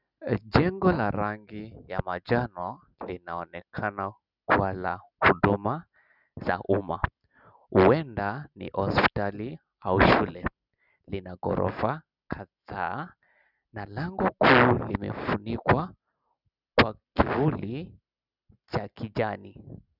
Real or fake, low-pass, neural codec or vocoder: real; 5.4 kHz; none